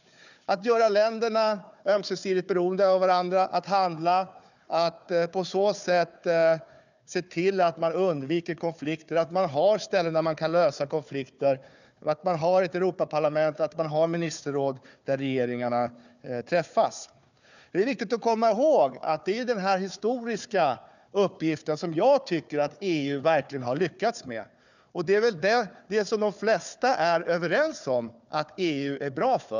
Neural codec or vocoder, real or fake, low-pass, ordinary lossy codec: codec, 16 kHz, 4 kbps, FunCodec, trained on Chinese and English, 50 frames a second; fake; 7.2 kHz; none